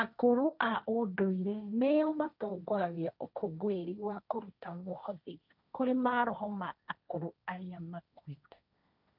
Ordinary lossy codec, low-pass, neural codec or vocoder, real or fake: none; 5.4 kHz; codec, 16 kHz, 1.1 kbps, Voila-Tokenizer; fake